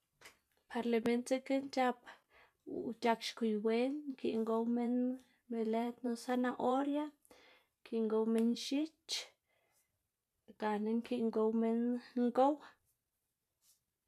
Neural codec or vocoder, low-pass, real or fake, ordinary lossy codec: vocoder, 44.1 kHz, 128 mel bands every 512 samples, BigVGAN v2; 14.4 kHz; fake; none